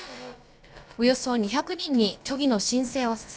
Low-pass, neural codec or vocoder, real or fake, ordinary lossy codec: none; codec, 16 kHz, about 1 kbps, DyCAST, with the encoder's durations; fake; none